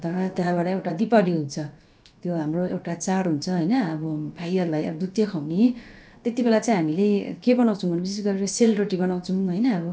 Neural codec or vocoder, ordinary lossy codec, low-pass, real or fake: codec, 16 kHz, about 1 kbps, DyCAST, with the encoder's durations; none; none; fake